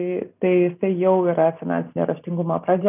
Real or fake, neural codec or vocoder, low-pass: real; none; 3.6 kHz